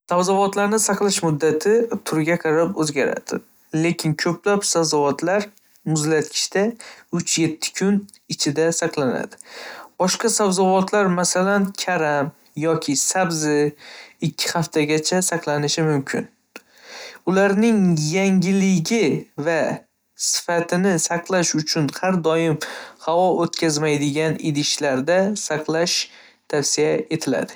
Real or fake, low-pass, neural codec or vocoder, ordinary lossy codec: real; none; none; none